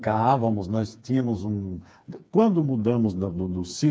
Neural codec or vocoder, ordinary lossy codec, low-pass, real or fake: codec, 16 kHz, 4 kbps, FreqCodec, smaller model; none; none; fake